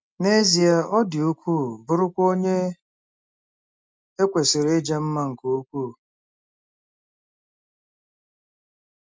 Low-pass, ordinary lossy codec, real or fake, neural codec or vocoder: none; none; real; none